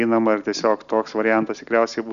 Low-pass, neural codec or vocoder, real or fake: 7.2 kHz; none; real